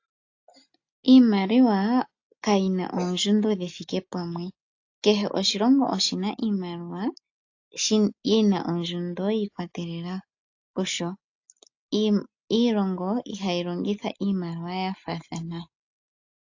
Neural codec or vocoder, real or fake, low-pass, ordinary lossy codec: none; real; 7.2 kHz; AAC, 48 kbps